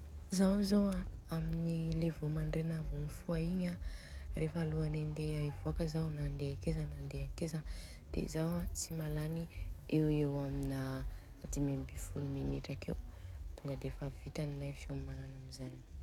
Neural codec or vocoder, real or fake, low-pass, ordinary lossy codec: codec, 44.1 kHz, 7.8 kbps, DAC; fake; 19.8 kHz; none